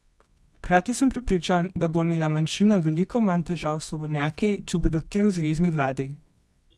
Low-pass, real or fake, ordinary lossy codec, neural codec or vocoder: none; fake; none; codec, 24 kHz, 0.9 kbps, WavTokenizer, medium music audio release